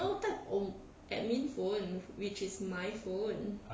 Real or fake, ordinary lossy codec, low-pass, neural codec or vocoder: real; none; none; none